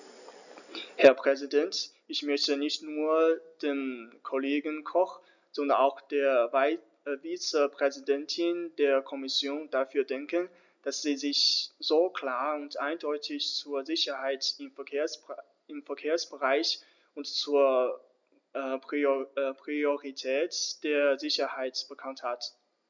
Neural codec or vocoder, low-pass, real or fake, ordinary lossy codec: none; 7.2 kHz; real; none